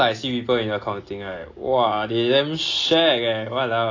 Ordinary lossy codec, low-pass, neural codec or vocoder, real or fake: AAC, 32 kbps; 7.2 kHz; none; real